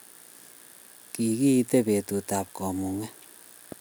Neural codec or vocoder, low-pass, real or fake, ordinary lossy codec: none; none; real; none